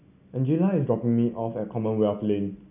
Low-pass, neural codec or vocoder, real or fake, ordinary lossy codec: 3.6 kHz; none; real; none